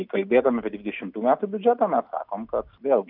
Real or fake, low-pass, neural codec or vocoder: real; 5.4 kHz; none